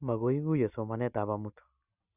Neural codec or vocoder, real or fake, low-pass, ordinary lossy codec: none; real; 3.6 kHz; none